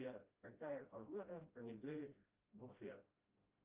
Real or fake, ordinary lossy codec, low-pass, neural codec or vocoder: fake; Opus, 32 kbps; 3.6 kHz; codec, 16 kHz, 0.5 kbps, FreqCodec, smaller model